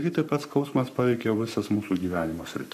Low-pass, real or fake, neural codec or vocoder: 14.4 kHz; fake; codec, 44.1 kHz, 7.8 kbps, Pupu-Codec